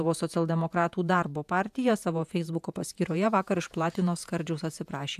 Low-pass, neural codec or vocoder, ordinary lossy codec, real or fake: 14.4 kHz; vocoder, 48 kHz, 128 mel bands, Vocos; AAC, 96 kbps; fake